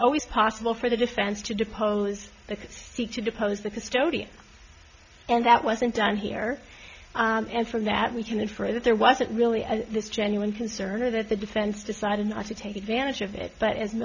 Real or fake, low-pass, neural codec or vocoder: real; 7.2 kHz; none